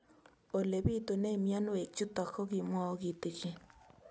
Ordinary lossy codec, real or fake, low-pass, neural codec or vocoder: none; real; none; none